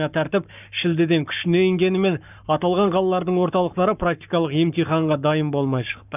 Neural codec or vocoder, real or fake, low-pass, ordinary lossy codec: codec, 44.1 kHz, 7.8 kbps, Pupu-Codec; fake; 3.6 kHz; none